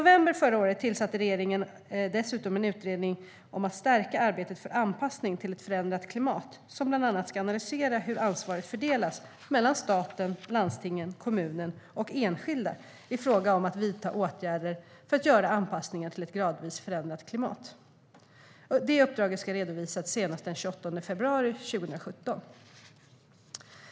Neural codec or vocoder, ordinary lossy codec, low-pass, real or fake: none; none; none; real